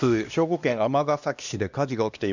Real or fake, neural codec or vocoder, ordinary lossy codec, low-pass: fake; codec, 16 kHz, 1 kbps, X-Codec, HuBERT features, trained on LibriSpeech; none; 7.2 kHz